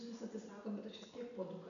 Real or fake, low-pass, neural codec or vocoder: real; 7.2 kHz; none